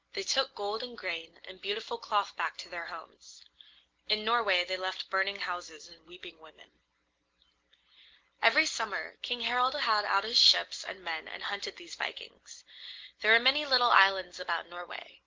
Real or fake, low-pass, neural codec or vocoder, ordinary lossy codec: real; 7.2 kHz; none; Opus, 16 kbps